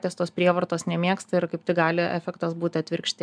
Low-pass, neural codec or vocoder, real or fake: 9.9 kHz; none; real